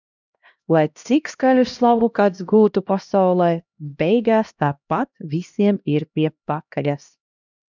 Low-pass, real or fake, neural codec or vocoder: 7.2 kHz; fake; codec, 16 kHz, 1 kbps, X-Codec, HuBERT features, trained on LibriSpeech